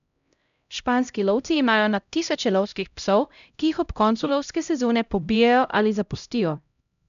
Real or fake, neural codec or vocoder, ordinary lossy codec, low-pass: fake; codec, 16 kHz, 0.5 kbps, X-Codec, HuBERT features, trained on LibriSpeech; none; 7.2 kHz